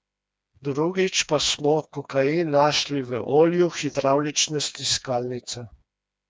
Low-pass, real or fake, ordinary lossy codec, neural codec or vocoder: none; fake; none; codec, 16 kHz, 2 kbps, FreqCodec, smaller model